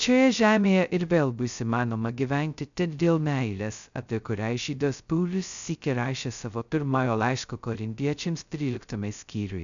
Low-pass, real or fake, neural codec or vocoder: 7.2 kHz; fake; codec, 16 kHz, 0.2 kbps, FocalCodec